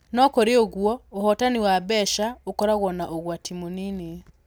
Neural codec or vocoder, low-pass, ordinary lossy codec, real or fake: none; none; none; real